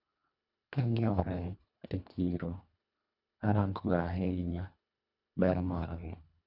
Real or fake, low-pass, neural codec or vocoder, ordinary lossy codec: fake; 5.4 kHz; codec, 24 kHz, 1.5 kbps, HILCodec; none